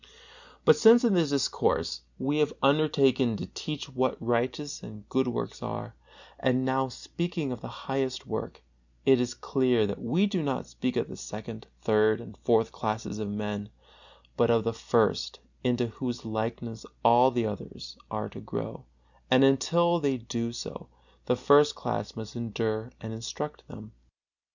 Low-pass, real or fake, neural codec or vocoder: 7.2 kHz; real; none